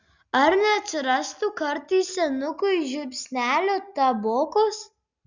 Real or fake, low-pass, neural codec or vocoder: real; 7.2 kHz; none